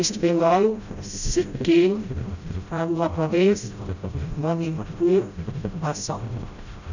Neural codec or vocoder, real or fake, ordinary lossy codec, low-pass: codec, 16 kHz, 0.5 kbps, FreqCodec, smaller model; fake; none; 7.2 kHz